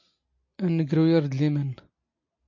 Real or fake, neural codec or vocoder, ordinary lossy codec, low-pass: real; none; MP3, 48 kbps; 7.2 kHz